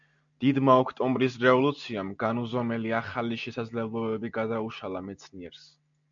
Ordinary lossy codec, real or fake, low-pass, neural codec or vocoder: MP3, 96 kbps; real; 7.2 kHz; none